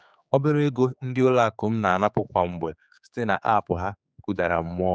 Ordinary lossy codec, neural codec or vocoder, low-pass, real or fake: none; codec, 16 kHz, 4 kbps, X-Codec, HuBERT features, trained on general audio; none; fake